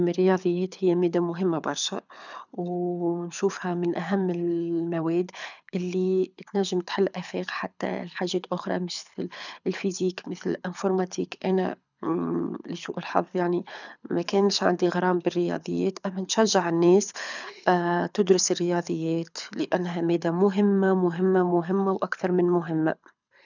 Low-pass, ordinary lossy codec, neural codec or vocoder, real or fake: 7.2 kHz; none; codec, 24 kHz, 6 kbps, HILCodec; fake